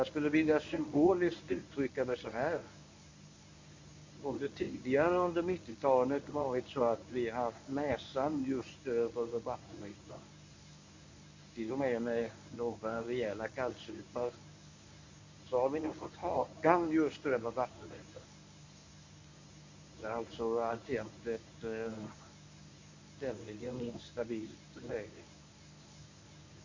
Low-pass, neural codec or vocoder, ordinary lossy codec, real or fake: 7.2 kHz; codec, 24 kHz, 0.9 kbps, WavTokenizer, medium speech release version 1; MP3, 64 kbps; fake